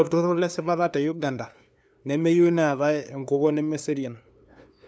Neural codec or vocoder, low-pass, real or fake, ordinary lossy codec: codec, 16 kHz, 2 kbps, FunCodec, trained on LibriTTS, 25 frames a second; none; fake; none